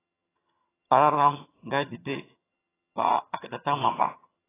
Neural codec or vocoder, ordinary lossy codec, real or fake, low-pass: vocoder, 22.05 kHz, 80 mel bands, HiFi-GAN; AAC, 16 kbps; fake; 3.6 kHz